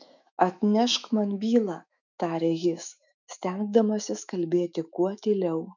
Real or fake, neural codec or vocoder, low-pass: fake; autoencoder, 48 kHz, 128 numbers a frame, DAC-VAE, trained on Japanese speech; 7.2 kHz